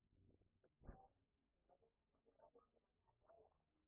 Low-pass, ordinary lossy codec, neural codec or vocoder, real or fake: 3.6 kHz; none; none; real